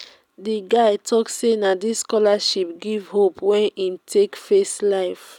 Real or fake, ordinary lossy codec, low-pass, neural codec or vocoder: real; none; 19.8 kHz; none